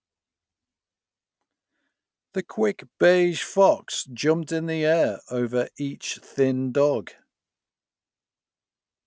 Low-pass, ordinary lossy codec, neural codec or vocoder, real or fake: none; none; none; real